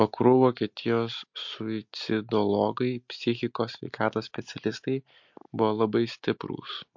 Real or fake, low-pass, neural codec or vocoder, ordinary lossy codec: real; 7.2 kHz; none; MP3, 48 kbps